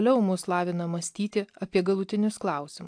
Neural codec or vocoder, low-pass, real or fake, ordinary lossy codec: none; 9.9 kHz; real; AAC, 64 kbps